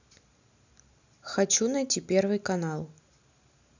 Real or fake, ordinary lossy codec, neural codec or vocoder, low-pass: real; none; none; 7.2 kHz